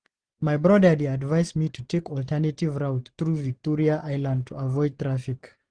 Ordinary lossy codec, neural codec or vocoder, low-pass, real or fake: Opus, 24 kbps; none; 9.9 kHz; real